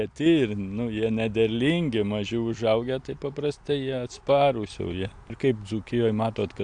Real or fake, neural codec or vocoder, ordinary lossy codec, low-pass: real; none; AAC, 64 kbps; 9.9 kHz